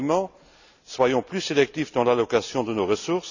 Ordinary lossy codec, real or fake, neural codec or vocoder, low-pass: none; real; none; 7.2 kHz